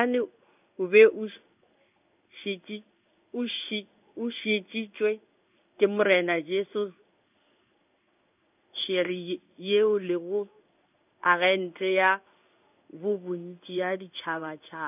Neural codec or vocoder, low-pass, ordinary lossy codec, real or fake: codec, 16 kHz in and 24 kHz out, 1 kbps, XY-Tokenizer; 3.6 kHz; none; fake